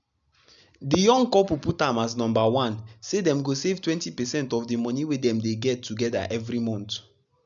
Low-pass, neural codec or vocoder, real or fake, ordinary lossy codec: 7.2 kHz; none; real; none